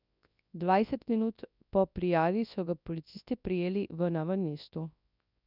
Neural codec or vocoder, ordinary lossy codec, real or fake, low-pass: codec, 24 kHz, 0.9 kbps, WavTokenizer, large speech release; none; fake; 5.4 kHz